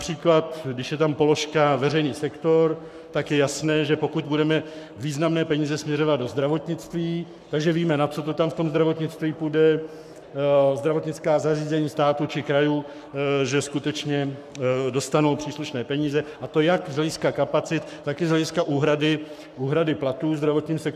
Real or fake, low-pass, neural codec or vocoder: fake; 14.4 kHz; codec, 44.1 kHz, 7.8 kbps, Pupu-Codec